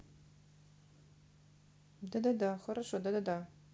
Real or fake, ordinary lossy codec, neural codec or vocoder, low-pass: real; none; none; none